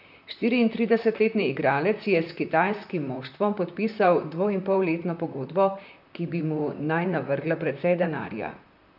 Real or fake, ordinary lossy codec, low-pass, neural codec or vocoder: fake; none; 5.4 kHz; vocoder, 44.1 kHz, 128 mel bands, Pupu-Vocoder